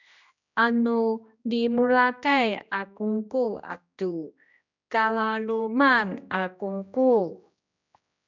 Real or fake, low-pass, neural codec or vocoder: fake; 7.2 kHz; codec, 16 kHz, 1 kbps, X-Codec, HuBERT features, trained on general audio